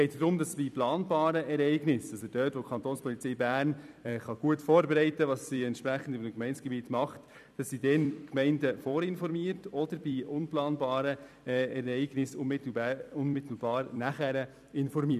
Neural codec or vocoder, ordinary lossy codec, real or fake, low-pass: vocoder, 44.1 kHz, 128 mel bands every 256 samples, BigVGAN v2; none; fake; 14.4 kHz